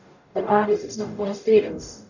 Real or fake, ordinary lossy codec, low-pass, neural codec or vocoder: fake; none; 7.2 kHz; codec, 44.1 kHz, 0.9 kbps, DAC